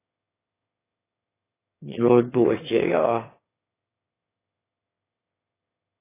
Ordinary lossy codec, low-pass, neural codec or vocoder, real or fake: AAC, 16 kbps; 3.6 kHz; autoencoder, 22.05 kHz, a latent of 192 numbers a frame, VITS, trained on one speaker; fake